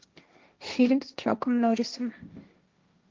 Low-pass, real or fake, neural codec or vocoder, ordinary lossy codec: 7.2 kHz; fake; codec, 16 kHz, 1.1 kbps, Voila-Tokenizer; Opus, 32 kbps